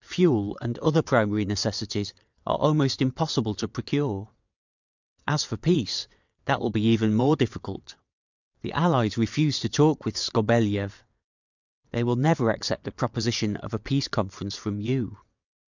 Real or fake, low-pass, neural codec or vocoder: fake; 7.2 kHz; vocoder, 22.05 kHz, 80 mel bands, WaveNeXt